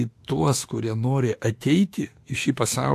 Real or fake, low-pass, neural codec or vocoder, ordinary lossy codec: fake; 14.4 kHz; autoencoder, 48 kHz, 32 numbers a frame, DAC-VAE, trained on Japanese speech; AAC, 64 kbps